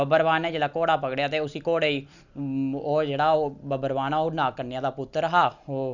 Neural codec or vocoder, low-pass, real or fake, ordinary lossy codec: none; 7.2 kHz; real; none